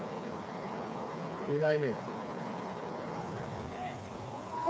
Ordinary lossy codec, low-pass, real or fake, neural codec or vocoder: none; none; fake; codec, 16 kHz, 4 kbps, FreqCodec, smaller model